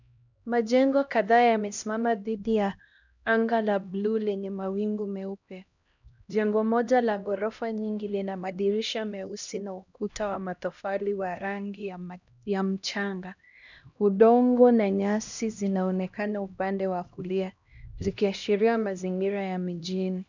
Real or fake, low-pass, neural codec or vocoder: fake; 7.2 kHz; codec, 16 kHz, 1 kbps, X-Codec, HuBERT features, trained on LibriSpeech